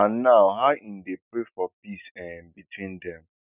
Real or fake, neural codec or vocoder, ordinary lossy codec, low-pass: real; none; none; 3.6 kHz